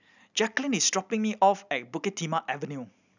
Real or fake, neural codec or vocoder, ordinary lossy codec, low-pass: real; none; none; 7.2 kHz